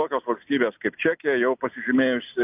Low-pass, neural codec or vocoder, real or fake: 3.6 kHz; none; real